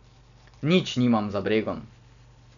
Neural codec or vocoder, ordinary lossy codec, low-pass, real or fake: none; none; 7.2 kHz; real